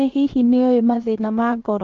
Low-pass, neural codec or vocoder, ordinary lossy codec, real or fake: 7.2 kHz; codec, 16 kHz, about 1 kbps, DyCAST, with the encoder's durations; Opus, 16 kbps; fake